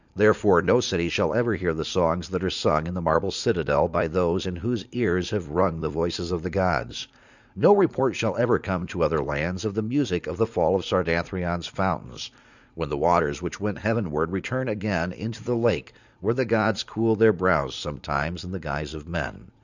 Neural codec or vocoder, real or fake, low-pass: vocoder, 44.1 kHz, 80 mel bands, Vocos; fake; 7.2 kHz